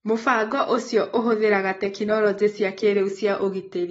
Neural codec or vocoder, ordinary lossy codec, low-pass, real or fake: none; AAC, 24 kbps; 19.8 kHz; real